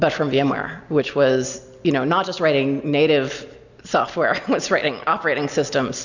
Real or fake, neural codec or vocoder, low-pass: real; none; 7.2 kHz